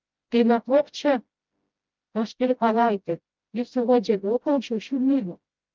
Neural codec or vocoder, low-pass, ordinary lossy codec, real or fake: codec, 16 kHz, 0.5 kbps, FreqCodec, smaller model; 7.2 kHz; Opus, 24 kbps; fake